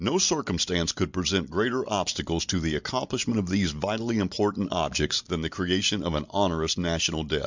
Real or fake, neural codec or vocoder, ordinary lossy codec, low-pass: real; none; Opus, 64 kbps; 7.2 kHz